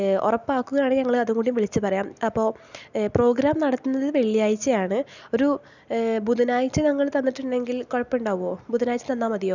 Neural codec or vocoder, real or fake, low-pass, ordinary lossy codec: none; real; 7.2 kHz; none